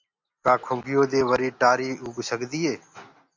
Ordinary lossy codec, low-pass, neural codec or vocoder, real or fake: MP3, 64 kbps; 7.2 kHz; none; real